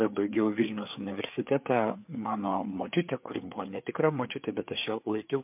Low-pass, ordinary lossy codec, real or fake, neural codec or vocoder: 3.6 kHz; MP3, 32 kbps; fake; codec, 16 kHz, 4 kbps, FreqCodec, larger model